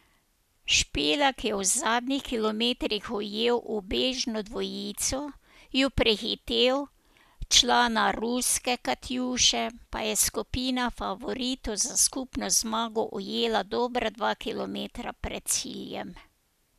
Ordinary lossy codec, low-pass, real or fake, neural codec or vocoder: none; 14.4 kHz; real; none